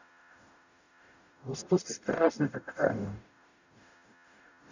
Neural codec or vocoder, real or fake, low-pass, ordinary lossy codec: codec, 44.1 kHz, 0.9 kbps, DAC; fake; 7.2 kHz; none